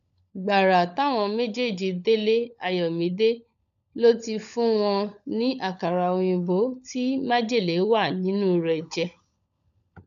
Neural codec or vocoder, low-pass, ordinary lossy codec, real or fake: codec, 16 kHz, 16 kbps, FunCodec, trained on LibriTTS, 50 frames a second; 7.2 kHz; none; fake